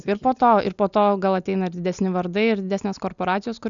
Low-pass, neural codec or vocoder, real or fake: 7.2 kHz; none; real